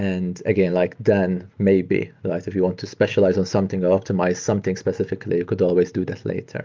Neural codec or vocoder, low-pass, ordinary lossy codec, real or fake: none; 7.2 kHz; Opus, 24 kbps; real